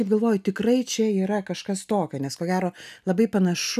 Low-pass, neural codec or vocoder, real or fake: 14.4 kHz; none; real